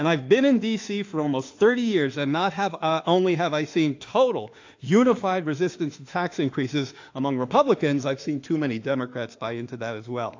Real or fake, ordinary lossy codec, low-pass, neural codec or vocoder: fake; AAC, 48 kbps; 7.2 kHz; autoencoder, 48 kHz, 32 numbers a frame, DAC-VAE, trained on Japanese speech